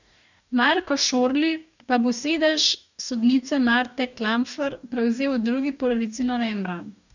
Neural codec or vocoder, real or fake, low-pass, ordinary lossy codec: codec, 44.1 kHz, 2.6 kbps, DAC; fake; 7.2 kHz; none